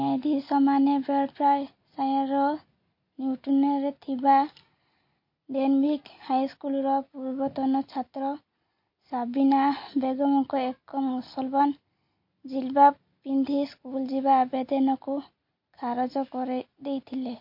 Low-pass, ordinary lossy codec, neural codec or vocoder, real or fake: 5.4 kHz; MP3, 32 kbps; none; real